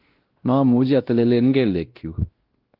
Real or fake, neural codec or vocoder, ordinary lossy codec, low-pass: fake; codec, 16 kHz, 1 kbps, X-Codec, WavLM features, trained on Multilingual LibriSpeech; Opus, 16 kbps; 5.4 kHz